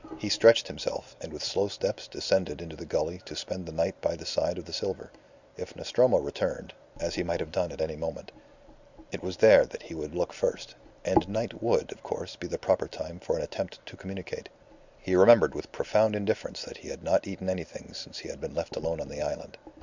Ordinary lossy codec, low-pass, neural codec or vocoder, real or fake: Opus, 64 kbps; 7.2 kHz; none; real